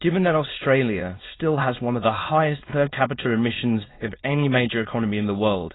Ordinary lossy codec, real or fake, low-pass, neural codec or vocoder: AAC, 16 kbps; fake; 7.2 kHz; autoencoder, 22.05 kHz, a latent of 192 numbers a frame, VITS, trained on many speakers